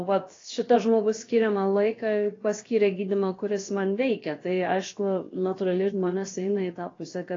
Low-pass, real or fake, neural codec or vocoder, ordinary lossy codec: 7.2 kHz; fake; codec, 16 kHz, about 1 kbps, DyCAST, with the encoder's durations; AAC, 32 kbps